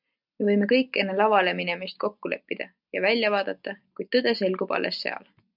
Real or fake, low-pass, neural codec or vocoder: real; 5.4 kHz; none